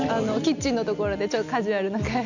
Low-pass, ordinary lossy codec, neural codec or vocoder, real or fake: 7.2 kHz; none; none; real